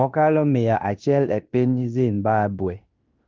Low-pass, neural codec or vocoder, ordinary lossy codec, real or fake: 7.2 kHz; codec, 16 kHz in and 24 kHz out, 0.9 kbps, LongCat-Audio-Codec, fine tuned four codebook decoder; Opus, 24 kbps; fake